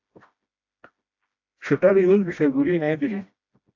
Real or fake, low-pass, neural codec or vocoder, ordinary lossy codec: fake; 7.2 kHz; codec, 16 kHz, 1 kbps, FreqCodec, smaller model; AAC, 48 kbps